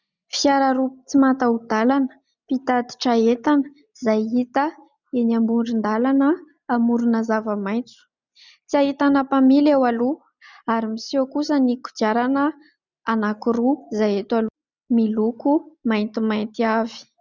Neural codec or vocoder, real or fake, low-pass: none; real; 7.2 kHz